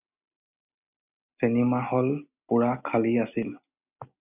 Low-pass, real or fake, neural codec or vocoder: 3.6 kHz; real; none